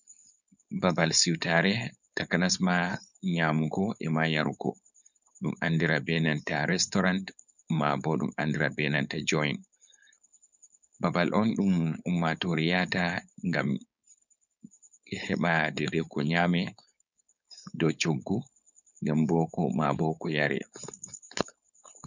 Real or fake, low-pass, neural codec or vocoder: fake; 7.2 kHz; codec, 16 kHz, 4.8 kbps, FACodec